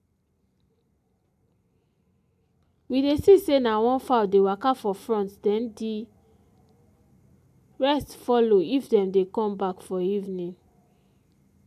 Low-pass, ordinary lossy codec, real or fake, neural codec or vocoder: 14.4 kHz; AAC, 96 kbps; real; none